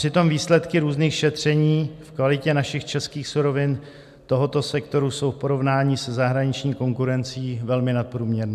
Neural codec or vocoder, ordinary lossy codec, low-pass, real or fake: none; MP3, 96 kbps; 14.4 kHz; real